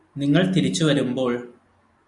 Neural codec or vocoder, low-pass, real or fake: none; 10.8 kHz; real